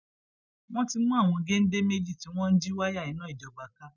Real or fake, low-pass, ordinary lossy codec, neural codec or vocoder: real; 7.2 kHz; none; none